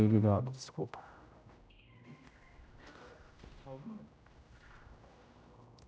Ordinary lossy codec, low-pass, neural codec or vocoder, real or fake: none; none; codec, 16 kHz, 0.5 kbps, X-Codec, HuBERT features, trained on general audio; fake